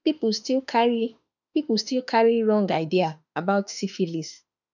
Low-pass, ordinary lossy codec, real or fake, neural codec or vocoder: 7.2 kHz; none; fake; autoencoder, 48 kHz, 32 numbers a frame, DAC-VAE, trained on Japanese speech